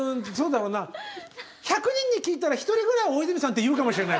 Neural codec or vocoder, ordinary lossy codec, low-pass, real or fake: none; none; none; real